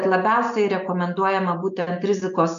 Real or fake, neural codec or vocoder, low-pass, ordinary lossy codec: real; none; 7.2 kHz; AAC, 96 kbps